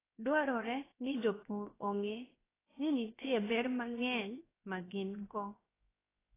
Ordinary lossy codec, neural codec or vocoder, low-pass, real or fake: AAC, 16 kbps; codec, 16 kHz, 0.7 kbps, FocalCodec; 3.6 kHz; fake